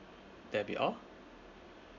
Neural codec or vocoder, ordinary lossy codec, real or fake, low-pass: none; none; real; 7.2 kHz